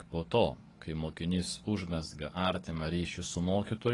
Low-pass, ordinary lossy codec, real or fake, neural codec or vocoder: 10.8 kHz; AAC, 32 kbps; fake; codec, 44.1 kHz, 7.8 kbps, DAC